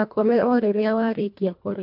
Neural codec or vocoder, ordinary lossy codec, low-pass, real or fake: codec, 24 kHz, 1.5 kbps, HILCodec; MP3, 48 kbps; 5.4 kHz; fake